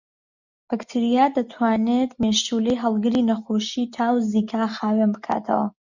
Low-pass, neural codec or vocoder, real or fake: 7.2 kHz; none; real